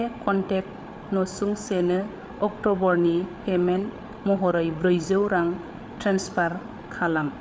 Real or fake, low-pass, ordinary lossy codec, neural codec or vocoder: fake; none; none; codec, 16 kHz, 8 kbps, FreqCodec, larger model